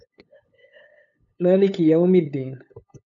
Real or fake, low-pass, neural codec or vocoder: fake; 7.2 kHz; codec, 16 kHz, 8 kbps, FunCodec, trained on LibriTTS, 25 frames a second